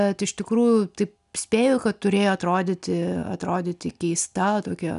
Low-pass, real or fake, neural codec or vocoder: 10.8 kHz; real; none